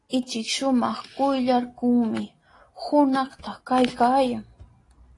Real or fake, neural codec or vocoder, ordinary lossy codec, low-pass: real; none; AAC, 32 kbps; 10.8 kHz